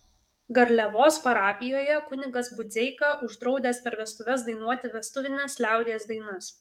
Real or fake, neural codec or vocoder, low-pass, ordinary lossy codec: fake; codec, 44.1 kHz, 7.8 kbps, DAC; 19.8 kHz; MP3, 96 kbps